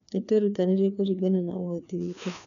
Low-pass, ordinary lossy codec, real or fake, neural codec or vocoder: 7.2 kHz; none; fake; codec, 16 kHz, 2 kbps, FreqCodec, larger model